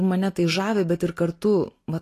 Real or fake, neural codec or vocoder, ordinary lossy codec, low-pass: real; none; AAC, 48 kbps; 14.4 kHz